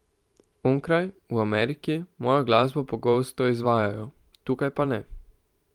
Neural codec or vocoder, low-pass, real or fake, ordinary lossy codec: vocoder, 44.1 kHz, 128 mel bands every 512 samples, BigVGAN v2; 19.8 kHz; fake; Opus, 32 kbps